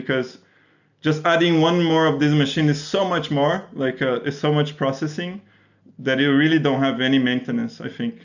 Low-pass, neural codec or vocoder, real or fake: 7.2 kHz; none; real